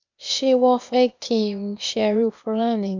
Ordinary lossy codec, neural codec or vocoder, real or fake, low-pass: MP3, 64 kbps; codec, 16 kHz, 0.8 kbps, ZipCodec; fake; 7.2 kHz